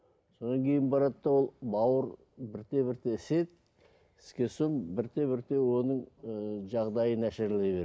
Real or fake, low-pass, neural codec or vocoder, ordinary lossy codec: real; none; none; none